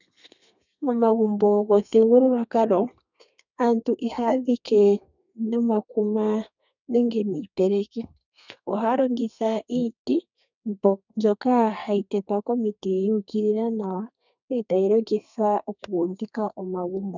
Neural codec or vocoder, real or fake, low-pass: codec, 32 kHz, 1.9 kbps, SNAC; fake; 7.2 kHz